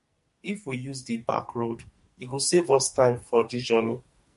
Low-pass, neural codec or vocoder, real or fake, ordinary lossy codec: 14.4 kHz; codec, 44.1 kHz, 2.6 kbps, SNAC; fake; MP3, 48 kbps